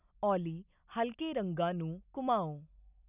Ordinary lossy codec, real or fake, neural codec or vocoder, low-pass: none; real; none; 3.6 kHz